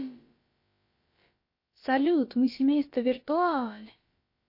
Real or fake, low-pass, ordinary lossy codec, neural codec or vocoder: fake; 5.4 kHz; MP3, 32 kbps; codec, 16 kHz, about 1 kbps, DyCAST, with the encoder's durations